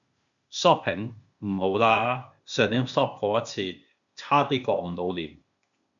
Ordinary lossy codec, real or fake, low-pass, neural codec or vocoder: MP3, 96 kbps; fake; 7.2 kHz; codec, 16 kHz, 0.8 kbps, ZipCodec